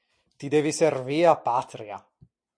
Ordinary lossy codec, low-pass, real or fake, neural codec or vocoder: MP3, 64 kbps; 9.9 kHz; real; none